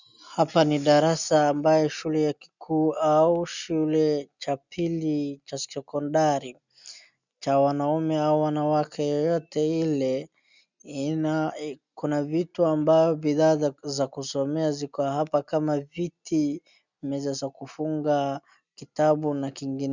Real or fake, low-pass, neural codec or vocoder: real; 7.2 kHz; none